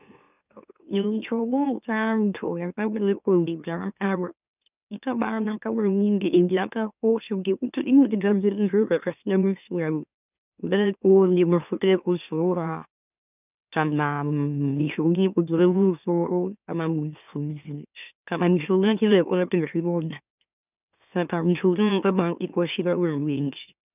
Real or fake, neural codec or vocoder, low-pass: fake; autoencoder, 44.1 kHz, a latent of 192 numbers a frame, MeloTTS; 3.6 kHz